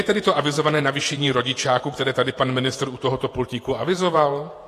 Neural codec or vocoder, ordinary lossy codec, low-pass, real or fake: vocoder, 48 kHz, 128 mel bands, Vocos; AAC, 48 kbps; 14.4 kHz; fake